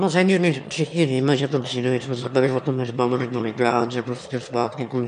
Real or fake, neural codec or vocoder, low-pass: fake; autoencoder, 22.05 kHz, a latent of 192 numbers a frame, VITS, trained on one speaker; 9.9 kHz